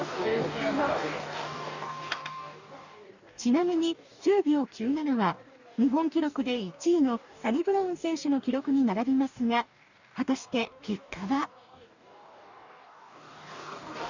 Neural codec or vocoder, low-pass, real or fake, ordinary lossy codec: codec, 44.1 kHz, 2.6 kbps, DAC; 7.2 kHz; fake; none